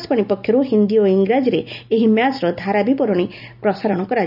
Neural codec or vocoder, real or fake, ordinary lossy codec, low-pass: none; real; none; 5.4 kHz